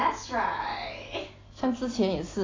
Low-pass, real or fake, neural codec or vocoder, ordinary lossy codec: 7.2 kHz; real; none; AAC, 32 kbps